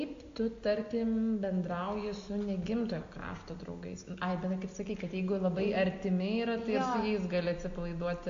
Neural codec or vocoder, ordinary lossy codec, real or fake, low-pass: none; AAC, 48 kbps; real; 7.2 kHz